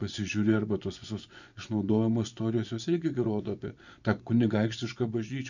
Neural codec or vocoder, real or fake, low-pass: vocoder, 24 kHz, 100 mel bands, Vocos; fake; 7.2 kHz